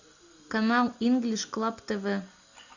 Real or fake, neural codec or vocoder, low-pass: real; none; 7.2 kHz